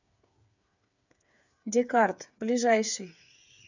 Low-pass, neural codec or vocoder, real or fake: 7.2 kHz; codec, 16 kHz, 8 kbps, FreqCodec, smaller model; fake